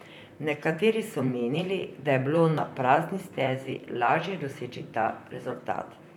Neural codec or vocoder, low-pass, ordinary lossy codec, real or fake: vocoder, 44.1 kHz, 128 mel bands, Pupu-Vocoder; 19.8 kHz; none; fake